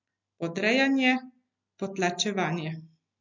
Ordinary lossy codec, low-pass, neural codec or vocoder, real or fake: none; 7.2 kHz; none; real